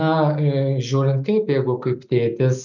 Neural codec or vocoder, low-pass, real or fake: none; 7.2 kHz; real